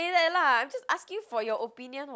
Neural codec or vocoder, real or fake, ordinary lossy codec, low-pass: none; real; none; none